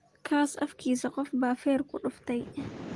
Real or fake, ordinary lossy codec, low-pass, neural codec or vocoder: real; Opus, 24 kbps; 10.8 kHz; none